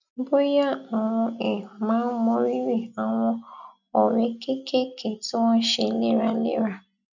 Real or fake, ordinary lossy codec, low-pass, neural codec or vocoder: real; none; 7.2 kHz; none